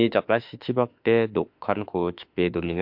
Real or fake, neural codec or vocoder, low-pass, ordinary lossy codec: fake; autoencoder, 48 kHz, 32 numbers a frame, DAC-VAE, trained on Japanese speech; 5.4 kHz; MP3, 48 kbps